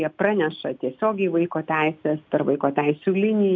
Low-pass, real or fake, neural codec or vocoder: 7.2 kHz; real; none